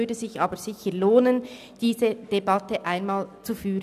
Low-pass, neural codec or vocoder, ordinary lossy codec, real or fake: 14.4 kHz; none; none; real